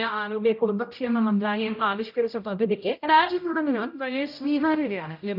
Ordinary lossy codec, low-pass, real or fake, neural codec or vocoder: none; 5.4 kHz; fake; codec, 16 kHz, 0.5 kbps, X-Codec, HuBERT features, trained on general audio